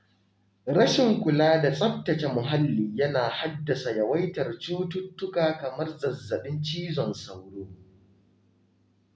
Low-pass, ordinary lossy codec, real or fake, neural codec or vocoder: none; none; real; none